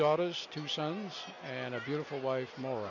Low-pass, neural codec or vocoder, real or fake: 7.2 kHz; none; real